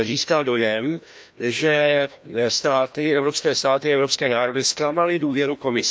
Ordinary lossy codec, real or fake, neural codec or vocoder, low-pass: none; fake; codec, 16 kHz, 1 kbps, FreqCodec, larger model; none